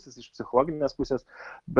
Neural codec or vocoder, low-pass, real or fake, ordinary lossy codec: none; 10.8 kHz; real; Opus, 32 kbps